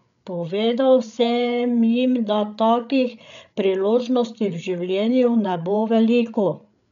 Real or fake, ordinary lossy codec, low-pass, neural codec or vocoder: fake; none; 7.2 kHz; codec, 16 kHz, 8 kbps, FreqCodec, larger model